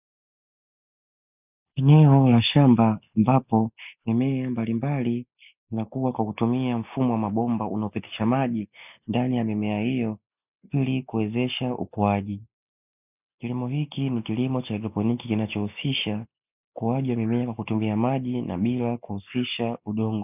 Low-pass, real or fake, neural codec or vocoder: 3.6 kHz; real; none